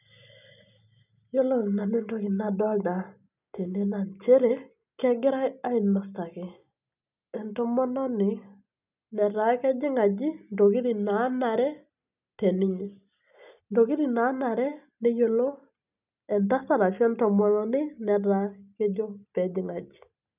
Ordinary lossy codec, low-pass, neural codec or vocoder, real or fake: none; 3.6 kHz; none; real